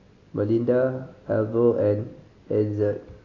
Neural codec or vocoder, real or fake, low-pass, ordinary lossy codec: none; real; 7.2 kHz; AAC, 32 kbps